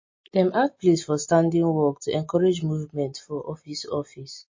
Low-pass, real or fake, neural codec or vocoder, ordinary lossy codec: 7.2 kHz; real; none; MP3, 32 kbps